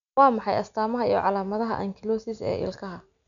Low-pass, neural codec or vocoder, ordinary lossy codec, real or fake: 7.2 kHz; none; none; real